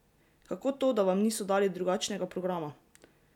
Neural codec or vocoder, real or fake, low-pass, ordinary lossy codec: none; real; 19.8 kHz; none